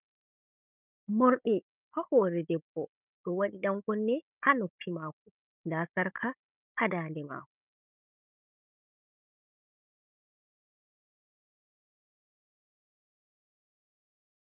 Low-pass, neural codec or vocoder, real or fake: 3.6 kHz; codec, 16 kHz, 16 kbps, FunCodec, trained on Chinese and English, 50 frames a second; fake